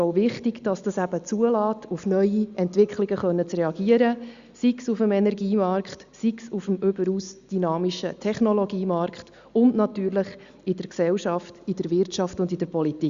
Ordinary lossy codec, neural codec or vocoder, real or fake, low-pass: Opus, 64 kbps; none; real; 7.2 kHz